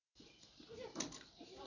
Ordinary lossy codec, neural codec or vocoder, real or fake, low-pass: none; none; real; 7.2 kHz